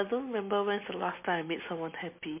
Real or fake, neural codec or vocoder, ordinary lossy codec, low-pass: real; none; MP3, 24 kbps; 3.6 kHz